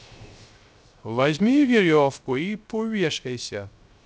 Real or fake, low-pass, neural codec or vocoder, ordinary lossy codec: fake; none; codec, 16 kHz, 0.3 kbps, FocalCodec; none